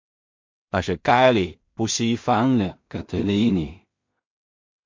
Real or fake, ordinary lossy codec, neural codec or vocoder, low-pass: fake; MP3, 48 kbps; codec, 16 kHz in and 24 kHz out, 0.4 kbps, LongCat-Audio-Codec, two codebook decoder; 7.2 kHz